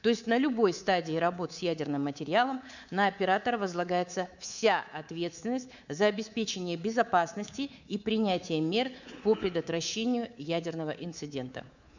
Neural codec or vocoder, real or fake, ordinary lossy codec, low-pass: codec, 24 kHz, 3.1 kbps, DualCodec; fake; none; 7.2 kHz